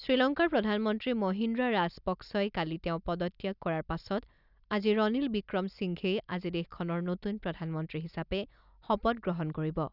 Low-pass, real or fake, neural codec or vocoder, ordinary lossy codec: 5.4 kHz; real; none; none